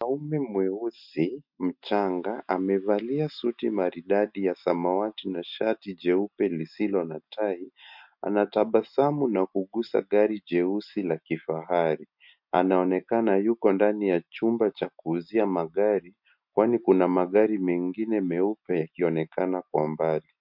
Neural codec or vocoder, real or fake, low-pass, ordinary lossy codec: none; real; 5.4 kHz; MP3, 48 kbps